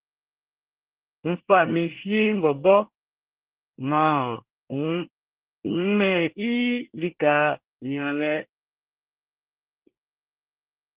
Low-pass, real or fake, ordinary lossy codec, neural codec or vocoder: 3.6 kHz; fake; Opus, 16 kbps; codec, 24 kHz, 1 kbps, SNAC